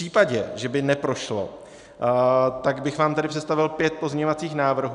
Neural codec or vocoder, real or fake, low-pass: none; real; 10.8 kHz